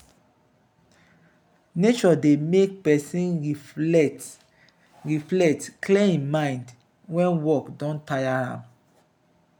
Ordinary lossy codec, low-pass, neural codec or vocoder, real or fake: none; none; none; real